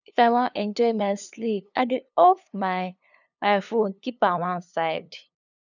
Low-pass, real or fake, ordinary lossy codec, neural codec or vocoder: 7.2 kHz; fake; none; codec, 16 kHz, 2 kbps, FunCodec, trained on LibriTTS, 25 frames a second